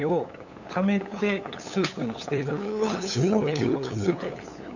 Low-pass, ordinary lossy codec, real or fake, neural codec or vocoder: 7.2 kHz; none; fake; codec, 16 kHz, 8 kbps, FunCodec, trained on LibriTTS, 25 frames a second